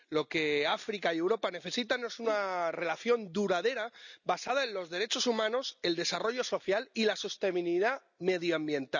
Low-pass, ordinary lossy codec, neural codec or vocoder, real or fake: 7.2 kHz; none; none; real